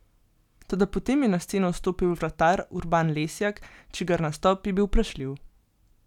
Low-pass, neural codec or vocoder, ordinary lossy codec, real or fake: 19.8 kHz; none; none; real